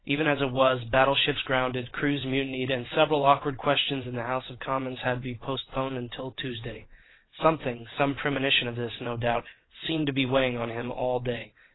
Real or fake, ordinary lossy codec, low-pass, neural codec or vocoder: fake; AAC, 16 kbps; 7.2 kHz; vocoder, 22.05 kHz, 80 mel bands, WaveNeXt